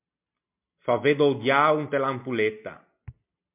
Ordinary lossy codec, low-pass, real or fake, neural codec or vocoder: MP3, 32 kbps; 3.6 kHz; real; none